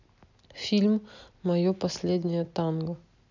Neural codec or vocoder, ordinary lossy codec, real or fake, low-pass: none; none; real; 7.2 kHz